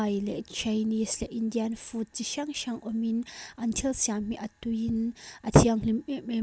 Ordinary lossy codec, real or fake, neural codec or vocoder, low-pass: none; real; none; none